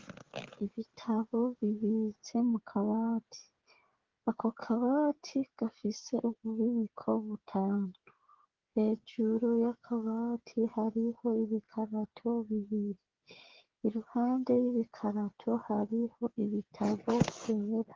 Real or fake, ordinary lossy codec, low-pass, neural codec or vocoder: fake; Opus, 16 kbps; 7.2 kHz; codec, 16 kHz, 16 kbps, FreqCodec, smaller model